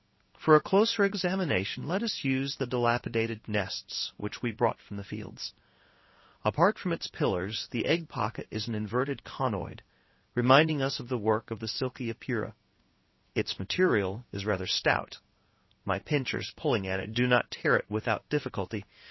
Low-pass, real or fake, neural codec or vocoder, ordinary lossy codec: 7.2 kHz; fake; codec, 16 kHz in and 24 kHz out, 1 kbps, XY-Tokenizer; MP3, 24 kbps